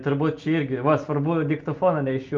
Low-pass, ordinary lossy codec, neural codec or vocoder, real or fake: 7.2 kHz; Opus, 24 kbps; none; real